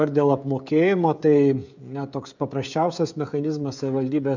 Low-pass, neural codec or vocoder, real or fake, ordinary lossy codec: 7.2 kHz; codec, 16 kHz, 16 kbps, FreqCodec, smaller model; fake; MP3, 64 kbps